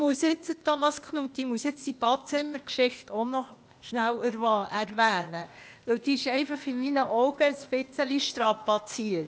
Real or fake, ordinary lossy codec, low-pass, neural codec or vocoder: fake; none; none; codec, 16 kHz, 0.8 kbps, ZipCodec